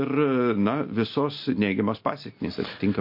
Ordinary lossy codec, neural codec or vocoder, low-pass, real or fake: MP3, 32 kbps; none; 5.4 kHz; real